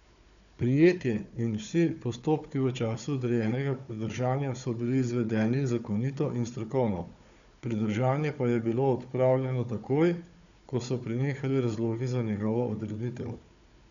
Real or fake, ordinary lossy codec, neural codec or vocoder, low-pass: fake; none; codec, 16 kHz, 4 kbps, FunCodec, trained on Chinese and English, 50 frames a second; 7.2 kHz